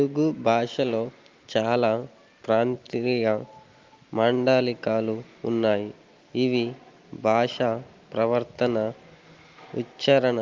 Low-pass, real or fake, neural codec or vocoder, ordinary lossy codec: 7.2 kHz; real; none; Opus, 32 kbps